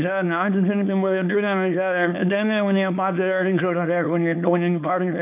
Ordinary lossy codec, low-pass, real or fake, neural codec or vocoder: none; 3.6 kHz; fake; codec, 24 kHz, 0.9 kbps, WavTokenizer, small release